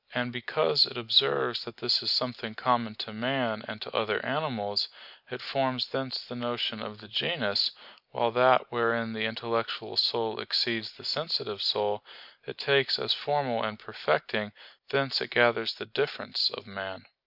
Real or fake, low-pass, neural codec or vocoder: real; 5.4 kHz; none